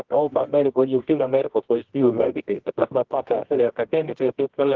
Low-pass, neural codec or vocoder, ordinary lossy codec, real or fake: 7.2 kHz; codec, 24 kHz, 0.9 kbps, WavTokenizer, medium music audio release; Opus, 16 kbps; fake